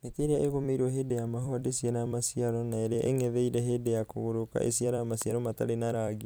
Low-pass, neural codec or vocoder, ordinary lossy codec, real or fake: none; vocoder, 44.1 kHz, 128 mel bands every 256 samples, BigVGAN v2; none; fake